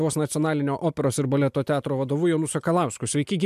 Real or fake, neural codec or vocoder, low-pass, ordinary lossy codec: real; none; 14.4 kHz; MP3, 96 kbps